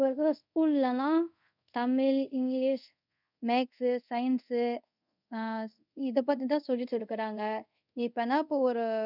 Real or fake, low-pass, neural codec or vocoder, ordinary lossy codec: fake; 5.4 kHz; codec, 24 kHz, 0.5 kbps, DualCodec; none